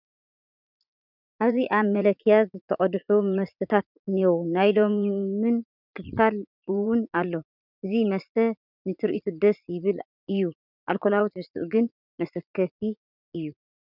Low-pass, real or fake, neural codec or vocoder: 5.4 kHz; fake; vocoder, 44.1 kHz, 80 mel bands, Vocos